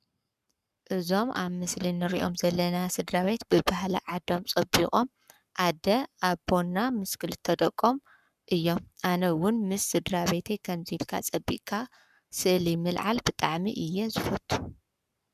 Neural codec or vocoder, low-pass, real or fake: codec, 44.1 kHz, 7.8 kbps, Pupu-Codec; 14.4 kHz; fake